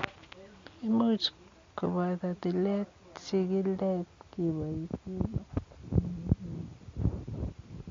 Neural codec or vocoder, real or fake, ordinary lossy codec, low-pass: none; real; MP3, 48 kbps; 7.2 kHz